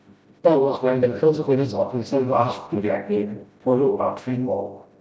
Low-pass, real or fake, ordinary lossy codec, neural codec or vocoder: none; fake; none; codec, 16 kHz, 0.5 kbps, FreqCodec, smaller model